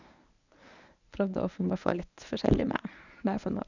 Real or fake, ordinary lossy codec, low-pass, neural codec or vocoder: fake; none; 7.2 kHz; codec, 24 kHz, 0.9 kbps, WavTokenizer, medium speech release version 1